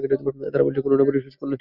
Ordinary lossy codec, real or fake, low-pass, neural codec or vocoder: Opus, 64 kbps; real; 5.4 kHz; none